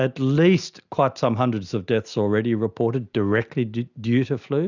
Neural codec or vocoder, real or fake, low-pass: none; real; 7.2 kHz